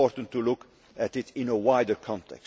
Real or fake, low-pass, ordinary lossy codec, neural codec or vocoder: real; none; none; none